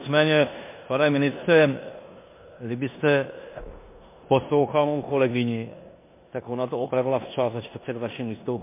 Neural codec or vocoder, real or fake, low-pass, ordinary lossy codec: codec, 16 kHz in and 24 kHz out, 0.9 kbps, LongCat-Audio-Codec, four codebook decoder; fake; 3.6 kHz; MP3, 24 kbps